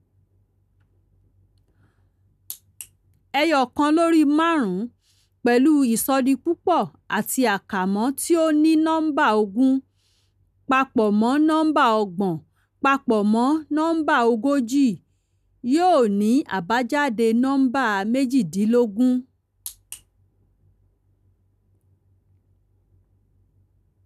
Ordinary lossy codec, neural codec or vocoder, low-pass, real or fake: none; none; 14.4 kHz; real